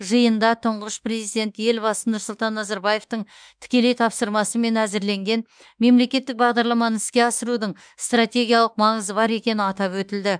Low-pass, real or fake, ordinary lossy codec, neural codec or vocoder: 9.9 kHz; fake; none; autoencoder, 48 kHz, 32 numbers a frame, DAC-VAE, trained on Japanese speech